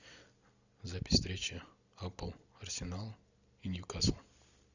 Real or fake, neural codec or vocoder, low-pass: real; none; 7.2 kHz